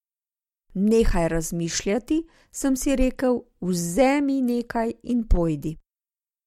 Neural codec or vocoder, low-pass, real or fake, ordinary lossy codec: none; 19.8 kHz; real; MP3, 64 kbps